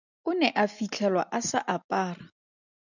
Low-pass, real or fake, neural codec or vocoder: 7.2 kHz; real; none